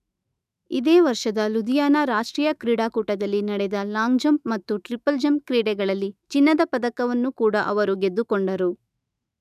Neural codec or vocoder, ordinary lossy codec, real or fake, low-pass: autoencoder, 48 kHz, 128 numbers a frame, DAC-VAE, trained on Japanese speech; none; fake; 14.4 kHz